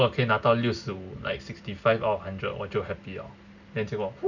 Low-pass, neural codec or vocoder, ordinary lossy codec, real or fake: 7.2 kHz; none; none; real